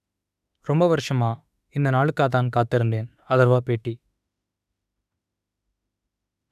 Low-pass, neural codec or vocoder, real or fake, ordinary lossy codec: 14.4 kHz; autoencoder, 48 kHz, 32 numbers a frame, DAC-VAE, trained on Japanese speech; fake; none